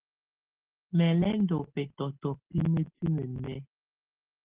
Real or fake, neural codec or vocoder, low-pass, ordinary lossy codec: real; none; 3.6 kHz; Opus, 16 kbps